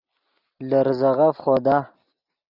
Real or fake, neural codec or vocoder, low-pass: real; none; 5.4 kHz